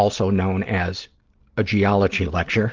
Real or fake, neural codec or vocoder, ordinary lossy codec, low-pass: real; none; Opus, 16 kbps; 7.2 kHz